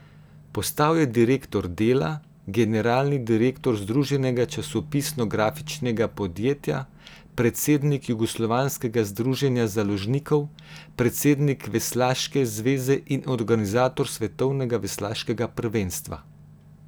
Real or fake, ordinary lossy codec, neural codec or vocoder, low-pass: real; none; none; none